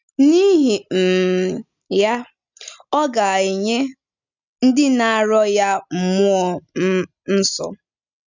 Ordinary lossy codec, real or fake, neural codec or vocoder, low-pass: none; real; none; 7.2 kHz